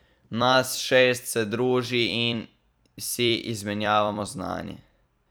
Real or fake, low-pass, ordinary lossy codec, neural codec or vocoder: fake; none; none; vocoder, 44.1 kHz, 128 mel bands every 256 samples, BigVGAN v2